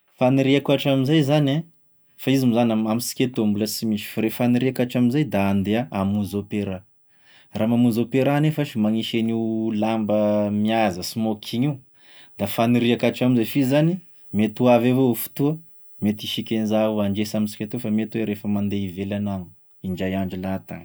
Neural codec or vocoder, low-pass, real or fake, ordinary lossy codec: none; none; real; none